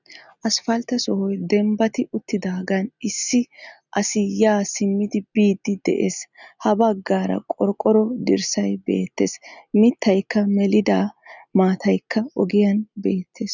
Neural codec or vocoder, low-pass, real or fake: none; 7.2 kHz; real